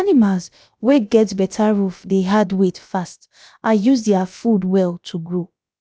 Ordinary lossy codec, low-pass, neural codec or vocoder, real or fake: none; none; codec, 16 kHz, about 1 kbps, DyCAST, with the encoder's durations; fake